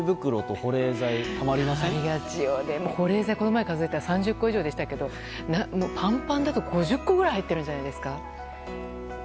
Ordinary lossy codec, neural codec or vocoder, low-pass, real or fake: none; none; none; real